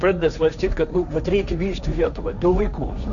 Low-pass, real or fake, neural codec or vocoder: 7.2 kHz; fake; codec, 16 kHz, 1.1 kbps, Voila-Tokenizer